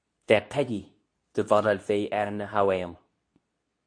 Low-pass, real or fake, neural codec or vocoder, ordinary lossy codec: 9.9 kHz; fake; codec, 24 kHz, 0.9 kbps, WavTokenizer, medium speech release version 2; AAC, 64 kbps